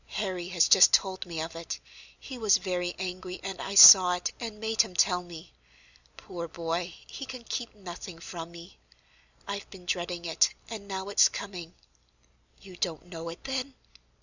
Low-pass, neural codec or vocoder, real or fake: 7.2 kHz; none; real